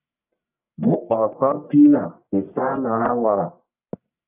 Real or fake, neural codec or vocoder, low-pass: fake; codec, 44.1 kHz, 1.7 kbps, Pupu-Codec; 3.6 kHz